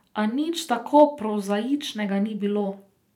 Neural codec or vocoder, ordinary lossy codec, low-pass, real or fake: vocoder, 44.1 kHz, 128 mel bands every 512 samples, BigVGAN v2; none; 19.8 kHz; fake